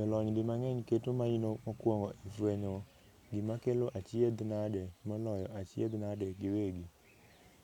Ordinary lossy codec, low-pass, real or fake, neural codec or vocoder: none; 19.8 kHz; real; none